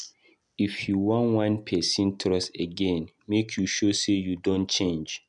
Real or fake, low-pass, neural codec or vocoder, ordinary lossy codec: real; 10.8 kHz; none; none